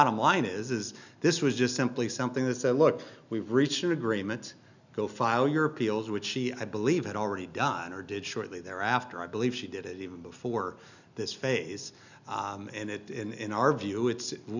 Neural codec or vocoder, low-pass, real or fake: none; 7.2 kHz; real